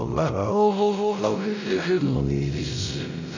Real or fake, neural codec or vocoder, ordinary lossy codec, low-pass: fake; codec, 16 kHz, 0.5 kbps, X-Codec, WavLM features, trained on Multilingual LibriSpeech; none; 7.2 kHz